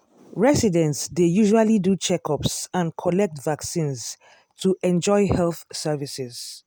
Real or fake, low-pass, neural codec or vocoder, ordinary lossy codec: real; none; none; none